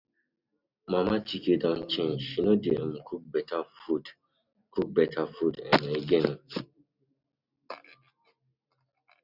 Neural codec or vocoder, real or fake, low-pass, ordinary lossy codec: none; real; 5.4 kHz; none